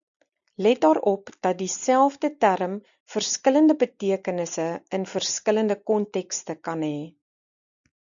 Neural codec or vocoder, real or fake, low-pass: none; real; 7.2 kHz